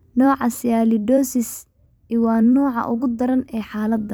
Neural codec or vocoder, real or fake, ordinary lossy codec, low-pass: vocoder, 44.1 kHz, 128 mel bands every 256 samples, BigVGAN v2; fake; none; none